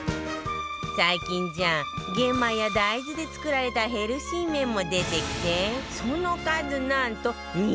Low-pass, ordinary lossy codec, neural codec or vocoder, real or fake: none; none; none; real